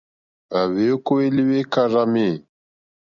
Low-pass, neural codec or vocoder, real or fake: 7.2 kHz; none; real